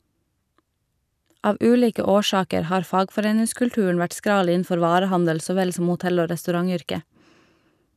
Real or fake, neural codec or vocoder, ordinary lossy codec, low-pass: real; none; none; 14.4 kHz